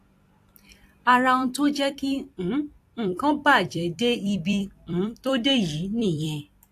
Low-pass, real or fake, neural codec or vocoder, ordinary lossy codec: 14.4 kHz; fake; vocoder, 44.1 kHz, 128 mel bands every 256 samples, BigVGAN v2; AAC, 64 kbps